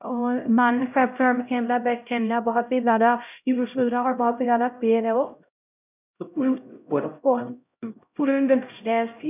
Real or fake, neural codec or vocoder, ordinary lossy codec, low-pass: fake; codec, 16 kHz, 0.5 kbps, X-Codec, HuBERT features, trained on LibriSpeech; none; 3.6 kHz